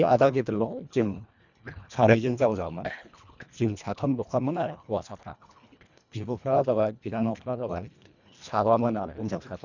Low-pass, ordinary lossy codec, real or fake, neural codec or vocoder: 7.2 kHz; none; fake; codec, 24 kHz, 1.5 kbps, HILCodec